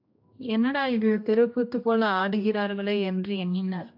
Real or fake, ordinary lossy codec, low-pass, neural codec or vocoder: fake; AAC, 48 kbps; 5.4 kHz; codec, 16 kHz, 1 kbps, X-Codec, HuBERT features, trained on general audio